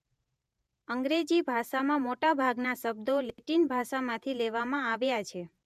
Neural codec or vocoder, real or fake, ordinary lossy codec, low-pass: vocoder, 48 kHz, 128 mel bands, Vocos; fake; none; 14.4 kHz